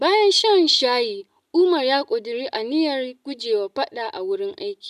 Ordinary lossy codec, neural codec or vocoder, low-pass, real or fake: none; none; 14.4 kHz; real